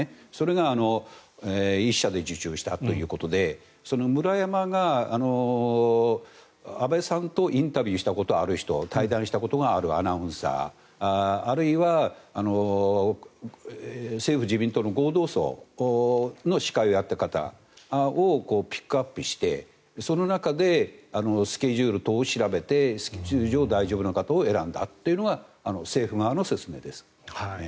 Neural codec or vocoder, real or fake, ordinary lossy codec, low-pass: none; real; none; none